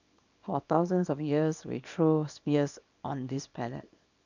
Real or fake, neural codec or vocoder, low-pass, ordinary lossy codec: fake; codec, 24 kHz, 0.9 kbps, WavTokenizer, small release; 7.2 kHz; none